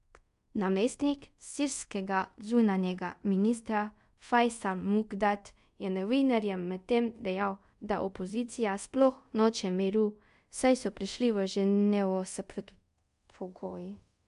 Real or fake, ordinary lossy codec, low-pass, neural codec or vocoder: fake; MP3, 64 kbps; 10.8 kHz; codec, 24 kHz, 0.5 kbps, DualCodec